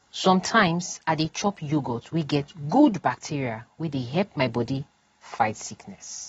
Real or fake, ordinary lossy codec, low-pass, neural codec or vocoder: real; AAC, 24 kbps; 10.8 kHz; none